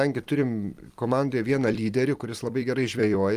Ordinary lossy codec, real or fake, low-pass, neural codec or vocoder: Opus, 32 kbps; fake; 14.4 kHz; vocoder, 44.1 kHz, 128 mel bands every 256 samples, BigVGAN v2